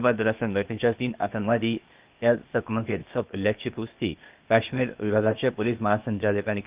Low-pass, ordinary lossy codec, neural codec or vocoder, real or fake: 3.6 kHz; Opus, 24 kbps; codec, 16 kHz, 0.8 kbps, ZipCodec; fake